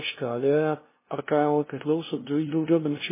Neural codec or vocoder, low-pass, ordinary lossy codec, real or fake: codec, 16 kHz, 0.5 kbps, FunCodec, trained on LibriTTS, 25 frames a second; 3.6 kHz; MP3, 16 kbps; fake